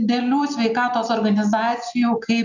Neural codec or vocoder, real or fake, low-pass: none; real; 7.2 kHz